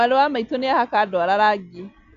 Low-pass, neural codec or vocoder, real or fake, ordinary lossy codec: 7.2 kHz; none; real; none